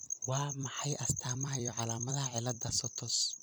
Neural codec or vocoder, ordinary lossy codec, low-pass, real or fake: vocoder, 44.1 kHz, 128 mel bands every 256 samples, BigVGAN v2; none; none; fake